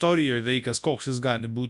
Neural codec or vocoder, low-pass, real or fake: codec, 24 kHz, 0.9 kbps, WavTokenizer, large speech release; 10.8 kHz; fake